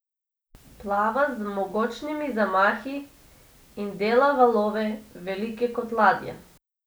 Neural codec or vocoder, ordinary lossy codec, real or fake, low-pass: none; none; real; none